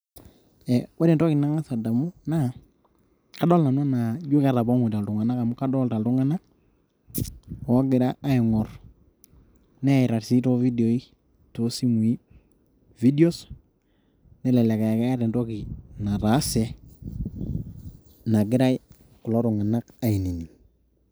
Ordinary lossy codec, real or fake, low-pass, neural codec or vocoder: none; real; none; none